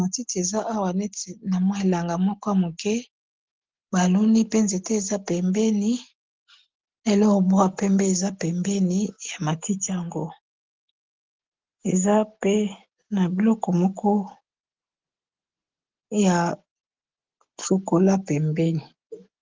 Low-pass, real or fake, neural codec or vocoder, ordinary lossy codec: 7.2 kHz; real; none; Opus, 16 kbps